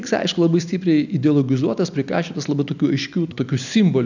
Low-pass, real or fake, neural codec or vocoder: 7.2 kHz; real; none